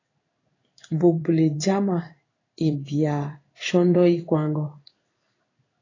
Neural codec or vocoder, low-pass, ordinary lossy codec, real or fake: codec, 16 kHz in and 24 kHz out, 1 kbps, XY-Tokenizer; 7.2 kHz; AAC, 32 kbps; fake